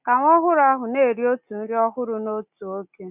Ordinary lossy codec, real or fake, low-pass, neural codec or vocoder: none; real; 3.6 kHz; none